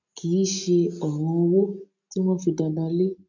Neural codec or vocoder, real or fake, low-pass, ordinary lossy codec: none; real; 7.2 kHz; none